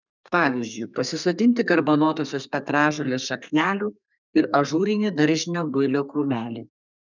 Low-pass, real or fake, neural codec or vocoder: 7.2 kHz; fake; codec, 32 kHz, 1.9 kbps, SNAC